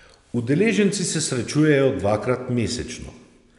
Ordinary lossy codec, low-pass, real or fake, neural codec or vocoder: none; 10.8 kHz; real; none